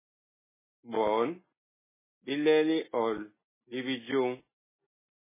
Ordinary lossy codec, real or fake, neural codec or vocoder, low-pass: MP3, 16 kbps; real; none; 3.6 kHz